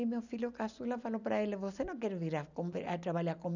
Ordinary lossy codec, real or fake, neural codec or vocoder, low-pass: none; real; none; 7.2 kHz